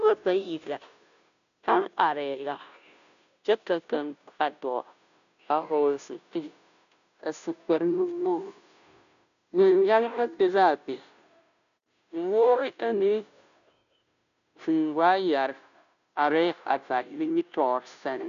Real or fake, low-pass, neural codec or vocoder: fake; 7.2 kHz; codec, 16 kHz, 0.5 kbps, FunCodec, trained on Chinese and English, 25 frames a second